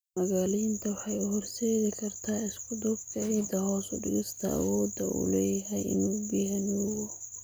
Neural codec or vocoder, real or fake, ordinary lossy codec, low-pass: none; real; none; none